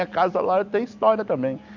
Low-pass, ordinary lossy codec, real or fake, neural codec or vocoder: 7.2 kHz; none; real; none